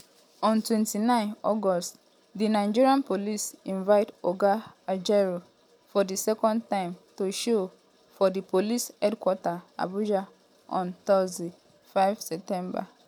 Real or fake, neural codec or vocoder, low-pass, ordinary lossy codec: real; none; 19.8 kHz; none